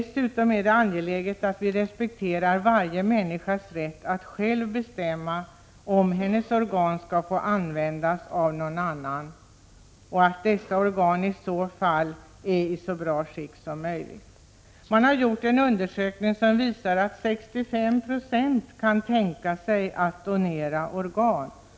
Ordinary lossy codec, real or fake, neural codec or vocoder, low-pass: none; real; none; none